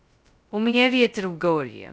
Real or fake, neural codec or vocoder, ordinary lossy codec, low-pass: fake; codec, 16 kHz, 0.2 kbps, FocalCodec; none; none